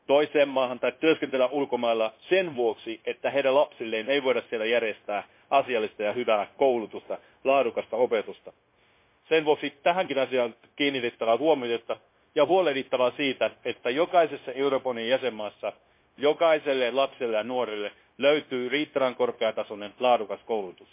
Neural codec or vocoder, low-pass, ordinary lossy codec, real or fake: codec, 16 kHz, 0.9 kbps, LongCat-Audio-Codec; 3.6 kHz; MP3, 24 kbps; fake